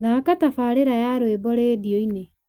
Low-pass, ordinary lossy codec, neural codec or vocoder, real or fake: 19.8 kHz; Opus, 32 kbps; none; real